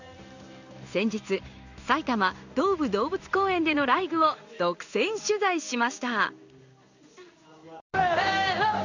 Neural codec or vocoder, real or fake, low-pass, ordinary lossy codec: codec, 16 kHz in and 24 kHz out, 1 kbps, XY-Tokenizer; fake; 7.2 kHz; none